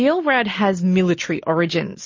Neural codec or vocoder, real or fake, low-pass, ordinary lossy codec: none; real; 7.2 kHz; MP3, 32 kbps